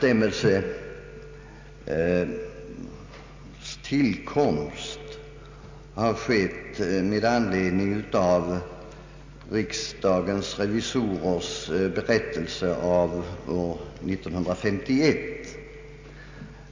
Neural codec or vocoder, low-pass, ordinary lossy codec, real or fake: none; 7.2 kHz; MP3, 64 kbps; real